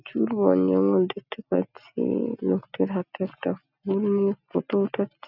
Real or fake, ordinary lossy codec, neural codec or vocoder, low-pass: real; none; none; 5.4 kHz